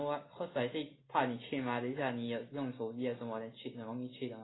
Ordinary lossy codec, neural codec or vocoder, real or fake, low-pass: AAC, 16 kbps; none; real; 7.2 kHz